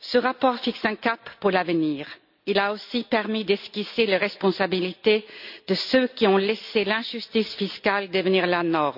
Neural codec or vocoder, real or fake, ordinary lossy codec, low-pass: none; real; none; 5.4 kHz